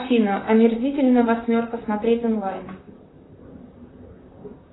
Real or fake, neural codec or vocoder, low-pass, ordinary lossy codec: fake; vocoder, 44.1 kHz, 128 mel bands, Pupu-Vocoder; 7.2 kHz; AAC, 16 kbps